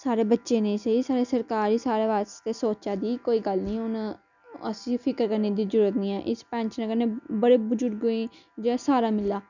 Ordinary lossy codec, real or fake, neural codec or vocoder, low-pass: none; real; none; 7.2 kHz